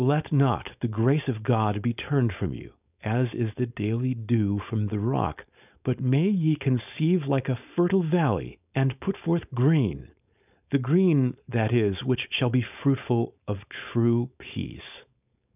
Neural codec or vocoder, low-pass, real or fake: codec, 16 kHz, 4.8 kbps, FACodec; 3.6 kHz; fake